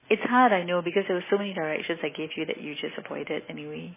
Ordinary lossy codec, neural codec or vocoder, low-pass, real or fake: MP3, 16 kbps; none; 3.6 kHz; real